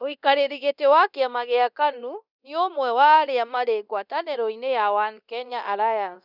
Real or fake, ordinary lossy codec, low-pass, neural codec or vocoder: fake; none; 5.4 kHz; codec, 24 kHz, 0.9 kbps, DualCodec